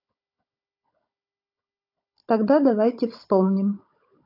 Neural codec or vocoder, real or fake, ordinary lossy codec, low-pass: codec, 16 kHz, 16 kbps, FunCodec, trained on Chinese and English, 50 frames a second; fake; AAC, 32 kbps; 5.4 kHz